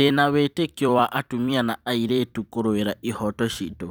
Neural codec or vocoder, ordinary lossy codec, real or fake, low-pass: vocoder, 44.1 kHz, 128 mel bands every 256 samples, BigVGAN v2; none; fake; none